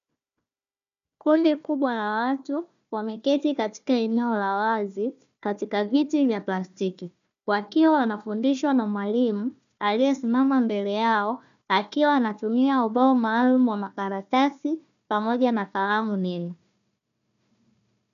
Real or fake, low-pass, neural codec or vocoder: fake; 7.2 kHz; codec, 16 kHz, 1 kbps, FunCodec, trained on Chinese and English, 50 frames a second